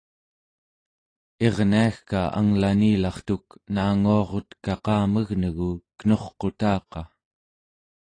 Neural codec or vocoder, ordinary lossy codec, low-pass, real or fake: none; AAC, 32 kbps; 9.9 kHz; real